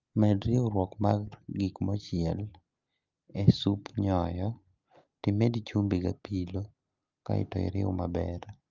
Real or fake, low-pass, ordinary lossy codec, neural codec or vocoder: real; 7.2 kHz; Opus, 24 kbps; none